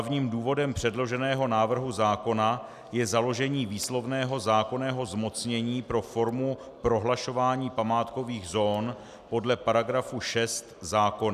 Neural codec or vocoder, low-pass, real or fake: none; 14.4 kHz; real